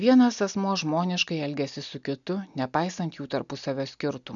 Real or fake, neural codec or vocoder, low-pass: real; none; 7.2 kHz